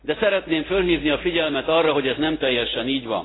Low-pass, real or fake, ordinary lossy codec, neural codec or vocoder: 7.2 kHz; real; AAC, 16 kbps; none